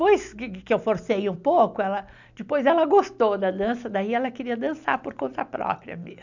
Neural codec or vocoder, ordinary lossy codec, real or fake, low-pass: none; none; real; 7.2 kHz